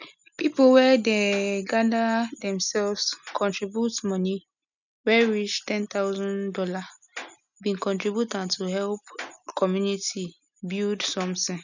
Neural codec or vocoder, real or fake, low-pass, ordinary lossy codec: none; real; 7.2 kHz; none